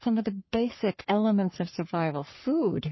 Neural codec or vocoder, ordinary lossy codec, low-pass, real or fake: codec, 44.1 kHz, 3.4 kbps, Pupu-Codec; MP3, 24 kbps; 7.2 kHz; fake